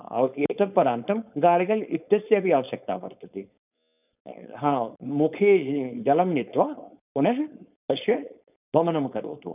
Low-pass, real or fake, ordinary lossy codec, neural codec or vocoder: 3.6 kHz; fake; none; codec, 16 kHz, 4.8 kbps, FACodec